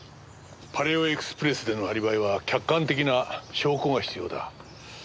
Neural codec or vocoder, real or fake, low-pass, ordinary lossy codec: none; real; none; none